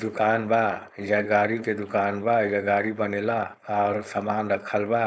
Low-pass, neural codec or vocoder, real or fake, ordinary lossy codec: none; codec, 16 kHz, 4.8 kbps, FACodec; fake; none